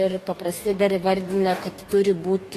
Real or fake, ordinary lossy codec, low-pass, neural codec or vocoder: fake; AAC, 48 kbps; 14.4 kHz; codec, 44.1 kHz, 2.6 kbps, DAC